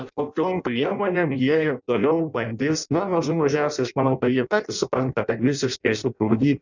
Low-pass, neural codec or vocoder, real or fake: 7.2 kHz; codec, 16 kHz in and 24 kHz out, 0.6 kbps, FireRedTTS-2 codec; fake